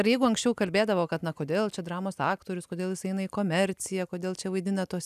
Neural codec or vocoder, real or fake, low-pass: none; real; 14.4 kHz